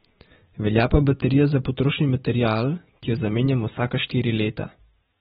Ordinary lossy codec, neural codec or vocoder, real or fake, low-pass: AAC, 16 kbps; none; real; 19.8 kHz